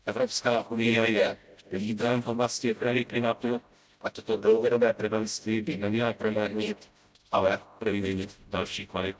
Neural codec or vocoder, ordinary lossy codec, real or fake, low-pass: codec, 16 kHz, 0.5 kbps, FreqCodec, smaller model; none; fake; none